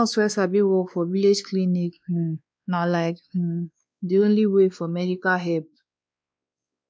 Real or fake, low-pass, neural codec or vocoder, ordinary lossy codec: fake; none; codec, 16 kHz, 2 kbps, X-Codec, WavLM features, trained on Multilingual LibriSpeech; none